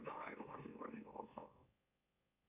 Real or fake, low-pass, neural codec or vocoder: fake; 3.6 kHz; autoencoder, 44.1 kHz, a latent of 192 numbers a frame, MeloTTS